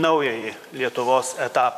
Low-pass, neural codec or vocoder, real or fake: 14.4 kHz; vocoder, 44.1 kHz, 128 mel bands, Pupu-Vocoder; fake